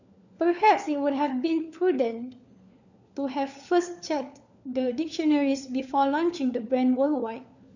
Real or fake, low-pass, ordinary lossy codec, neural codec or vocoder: fake; 7.2 kHz; none; codec, 16 kHz, 4 kbps, FunCodec, trained on LibriTTS, 50 frames a second